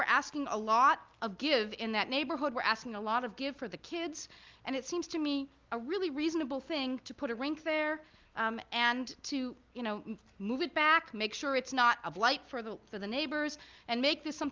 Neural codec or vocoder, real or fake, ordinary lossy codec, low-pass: none; real; Opus, 32 kbps; 7.2 kHz